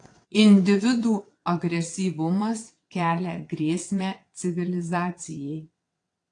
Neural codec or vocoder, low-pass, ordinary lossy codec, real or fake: vocoder, 22.05 kHz, 80 mel bands, WaveNeXt; 9.9 kHz; AAC, 48 kbps; fake